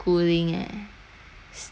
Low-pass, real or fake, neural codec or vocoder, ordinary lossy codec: none; real; none; none